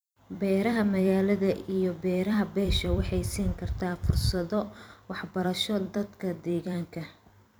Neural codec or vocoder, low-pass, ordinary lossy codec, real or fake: vocoder, 44.1 kHz, 128 mel bands every 256 samples, BigVGAN v2; none; none; fake